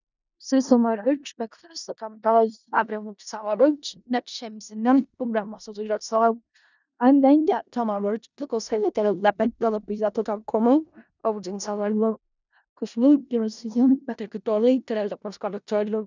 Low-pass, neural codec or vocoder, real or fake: 7.2 kHz; codec, 16 kHz in and 24 kHz out, 0.4 kbps, LongCat-Audio-Codec, four codebook decoder; fake